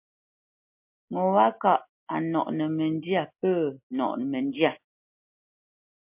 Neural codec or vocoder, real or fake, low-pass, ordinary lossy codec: none; real; 3.6 kHz; MP3, 32 kbps